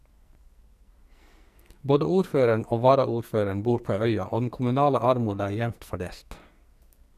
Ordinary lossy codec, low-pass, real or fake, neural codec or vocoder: none; 14.4 kHz; fake; codec, 44.1 kHz, 2.6 kbps, SNAC